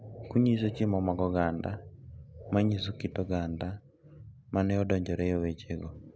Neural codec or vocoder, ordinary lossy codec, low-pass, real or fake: none; none; none; real